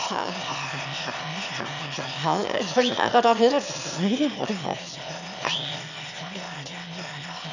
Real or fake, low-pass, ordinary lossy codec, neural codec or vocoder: fake; 7.2 kHz; none; autoencoder, 22.05 kHz, a latent of 192 numbers a frame, VITS, trained on one speaker